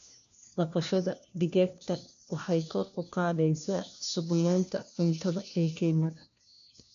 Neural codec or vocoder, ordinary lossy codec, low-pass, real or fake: codec, 16 kHz, 1 kbps, FunCodec, trained on LibriTTS, 50 frames a second; none; 7.2 kHz; fake